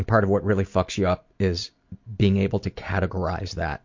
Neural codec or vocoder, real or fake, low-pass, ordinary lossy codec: none; real; 7.2 kHz; MP3, 48 kbps